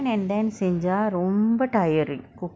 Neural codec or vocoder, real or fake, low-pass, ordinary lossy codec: none; real; none; none